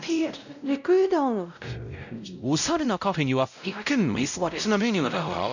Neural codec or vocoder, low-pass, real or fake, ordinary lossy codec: codec, 16 kHz, 0.5 kbps, X-Codec, WavLM features, trained on Multilingual LibriSpeech; 7.2 kHz; fake; none